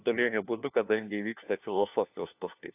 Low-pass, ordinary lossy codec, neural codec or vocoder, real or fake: 3.6 kHz; AAC, 32 kbps; codec, 16 kHz, 1 kbps, FunCodec, trained on LibriTTS, 50 frames a second; fake